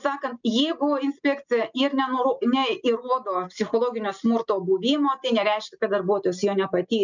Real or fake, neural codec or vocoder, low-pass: real; none; 7.2 kHz